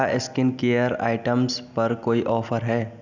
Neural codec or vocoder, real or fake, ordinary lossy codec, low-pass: none; real; none; 7.2 kHz